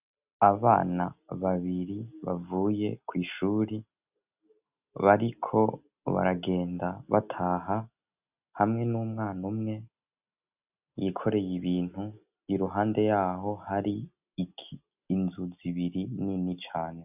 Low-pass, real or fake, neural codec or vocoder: 3.6 kHz; real; none